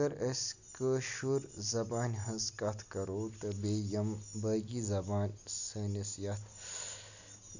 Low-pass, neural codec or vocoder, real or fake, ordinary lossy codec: 7.2 kHz; none; real; none